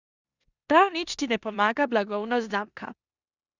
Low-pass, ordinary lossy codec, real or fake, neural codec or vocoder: 7.2 kHz; Opus, 64 kbps; fake; codec, 16 kHz in and 24 kHz out, 0.9 kbps, LongCat-Audio-Codec, four codebook decoder